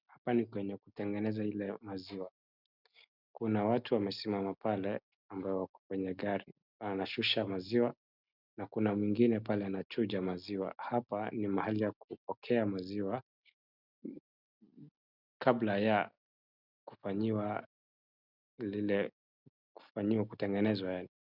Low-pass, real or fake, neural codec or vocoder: 5.4 kHz; real; none